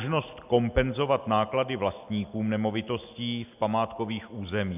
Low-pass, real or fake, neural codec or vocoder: 3.6 kHz; real; none